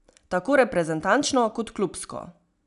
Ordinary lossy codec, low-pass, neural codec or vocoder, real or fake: none; 10.8 kHz; none; real